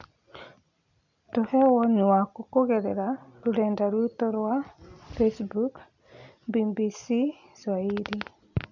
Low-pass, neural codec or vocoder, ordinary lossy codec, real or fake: 7.2 kHz; none; none; real